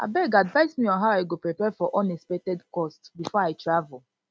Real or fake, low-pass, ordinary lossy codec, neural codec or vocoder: real; none; none; none